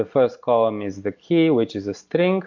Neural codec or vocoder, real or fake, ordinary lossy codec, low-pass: none; real; MP3, 48 kbps; 7.2 kHz